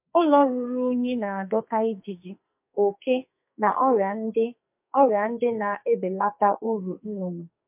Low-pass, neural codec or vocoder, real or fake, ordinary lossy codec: 3.6 kHz; codec, 44.1 kHz, 2.6 kbps, SNAC; fake; MP3, 32 kbps